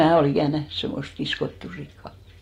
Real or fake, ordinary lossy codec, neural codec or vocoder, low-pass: real; AAC, 48 kbps; none; 19.8 kHz